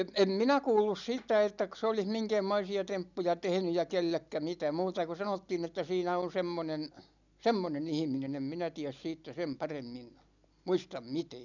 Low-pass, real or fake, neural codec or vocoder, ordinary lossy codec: 7.2 kHz; real; none; none